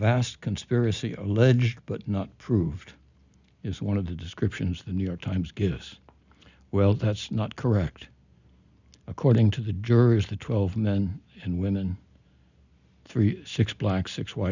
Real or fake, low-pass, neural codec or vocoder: real; 7.2 kHz; none